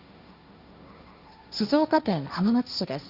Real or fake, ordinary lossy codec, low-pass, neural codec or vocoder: fake; Opus, 64 kbps; 5.4 kHz; codec, 16 kHz, 1.1 kbps, Voila-Tokenizer